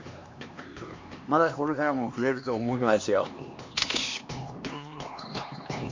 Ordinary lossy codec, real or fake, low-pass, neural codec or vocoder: MP3, 48 kbps; fake; 7.2 kHz; codec, 16 kHz, 2 kbps, X-Codec, HuBERT features, trained on LibriSpeech